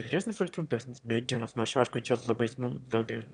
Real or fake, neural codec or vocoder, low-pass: fake; autoencoder, 22.05 kHz, a latent of 192 numbers a frame, VITS, trained on one speaker; 9.9 kHz